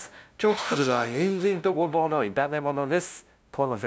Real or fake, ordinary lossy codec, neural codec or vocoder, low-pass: fake; none; codec, 16 kHz, 0.5 kbps, FunCodec, trained on LibriTTS, 25 frames a second; none